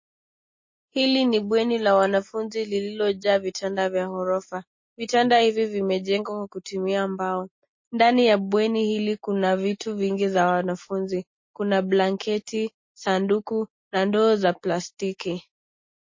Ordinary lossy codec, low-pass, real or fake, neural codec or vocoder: MP3, 32 kbps; 7.2 kHz; real; none